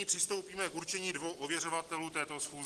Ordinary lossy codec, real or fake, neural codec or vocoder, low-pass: Opus, 16 kbps; real; none; 10.8 kHz